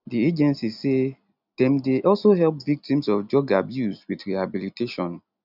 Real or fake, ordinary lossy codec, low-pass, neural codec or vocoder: real; none; 5.4 kHz; none